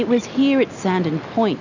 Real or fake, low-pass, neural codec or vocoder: real; 7.2 kHz; none